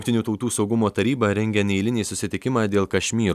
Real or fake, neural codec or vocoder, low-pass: real; none; 14.4 kHz